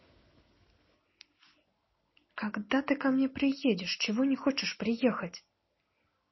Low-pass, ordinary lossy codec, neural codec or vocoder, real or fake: 7.2 kHz; MP3, 24 kbps; none; real